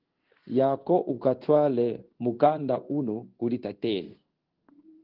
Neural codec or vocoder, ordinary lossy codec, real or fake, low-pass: codec, 16 kHz in and 24 kHz out, 1 kbps, XY-Tokenizer; Opus, 16 kbps; fake; 5.4 kHz